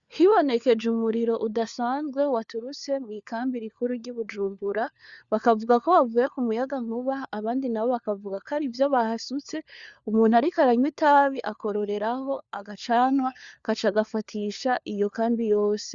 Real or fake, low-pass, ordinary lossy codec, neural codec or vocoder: fake; 7.2 kHz; Opus, 64 kbps; codec, 16 kHz, 4 kbps, FunCodec, trained on LibriTTS, 50 frames a second